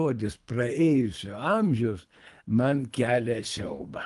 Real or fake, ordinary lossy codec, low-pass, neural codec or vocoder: fake; Opus, 32 kbps; 10.8 kHz; codec, 24 kHz, 3 kbps, HILCodec